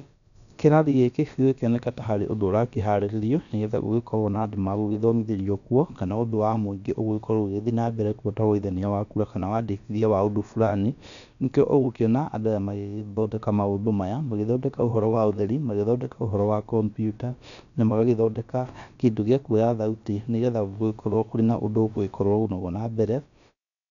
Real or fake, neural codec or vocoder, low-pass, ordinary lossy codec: fake; codec, 16 kHz, about 1 kbps, DyCAST, with the encoder's durations; 7.2 kHz; none